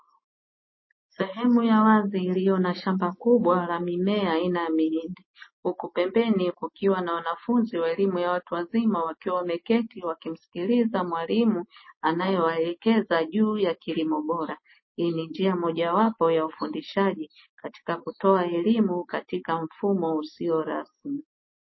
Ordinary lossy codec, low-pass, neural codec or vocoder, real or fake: MP3, 24 kbps; 7.2 kHz; none; real